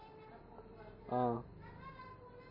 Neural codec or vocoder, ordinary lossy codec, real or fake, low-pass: none; MP3, 24 kbps; real; 5.4 kHz